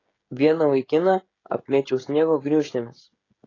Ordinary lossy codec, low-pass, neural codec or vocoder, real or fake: AAC, 32 kbps; 7.2 kHz; codec, 16 kHz, 16 kbps, FreqCodec, smaller model; fake